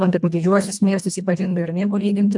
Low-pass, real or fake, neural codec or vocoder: 10.8 kHz; fake; codec, 24 kHz, 1.5 kbps, HILCodec